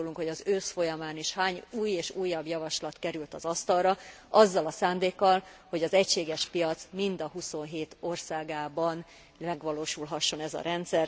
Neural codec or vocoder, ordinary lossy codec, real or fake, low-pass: none; none; real; none